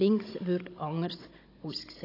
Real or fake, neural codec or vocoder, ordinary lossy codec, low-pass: fake; codec, 16 kHz, 16 kbps, FunCodec, trained on Chinese and English, 50 frames a second; AAC, 24 kbps; 5.4 kHz